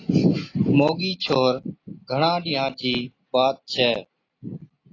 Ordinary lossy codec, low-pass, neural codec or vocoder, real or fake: AAC, 32 kbps; 7.2 kHz; none; real